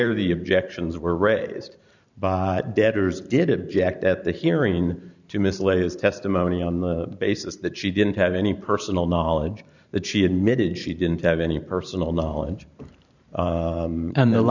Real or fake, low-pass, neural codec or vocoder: fake; 7.2 kHz; vocoder, 44.1 kHz, 128 mel bands every 512 samples, BigVGAN v2